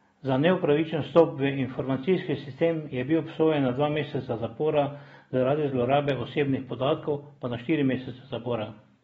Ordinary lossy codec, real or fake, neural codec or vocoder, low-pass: AAC, 24 kbps; real; none; 19.8 kHz